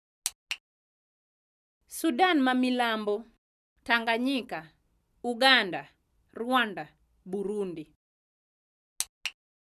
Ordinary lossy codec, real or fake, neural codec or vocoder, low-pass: none; real; none; 14.4 kHz